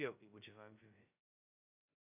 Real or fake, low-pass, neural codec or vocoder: fake; 3.6 kHz; codec, 16 kHz, 0.2 kbps, FocalCodec